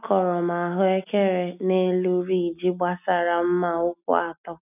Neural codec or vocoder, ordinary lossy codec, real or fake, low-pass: none; none; real; 3.6 kHz